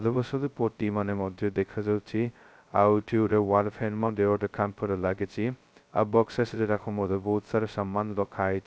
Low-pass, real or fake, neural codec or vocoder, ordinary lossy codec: none; fake; codec, 16 kHz, 0.2 kbps, FocalCodec; none